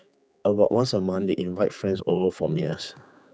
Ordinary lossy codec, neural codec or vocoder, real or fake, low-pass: none; codec, 16 kHz, 4 kbps, X-Codec, HuBERT features, trained on general audio; fake; none